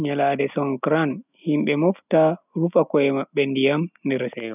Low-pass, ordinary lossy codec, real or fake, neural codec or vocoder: 3.6 kHz; none; real; none